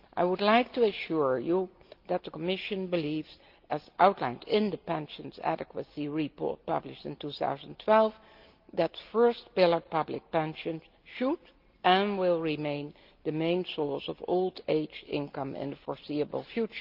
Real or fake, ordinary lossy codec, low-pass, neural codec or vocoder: real; Opus, 32 kbps; 5.4 kHz; none